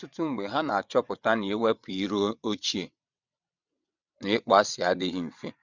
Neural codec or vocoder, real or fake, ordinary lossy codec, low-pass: vocoder, 22.05 kHz, 80 mel bands, WaveNeXt; fake; none; 7.2 kHz